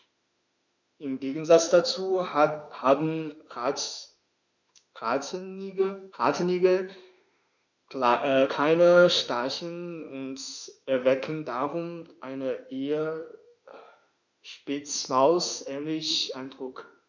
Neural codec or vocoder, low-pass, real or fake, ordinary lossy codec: autoencoder, 48 kHz, 32 numbers a frame, DAC-VAE, trained on Japanese speech; 7.2 kHz; fake; none